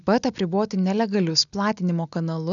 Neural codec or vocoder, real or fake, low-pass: none; real; 7.2 kHz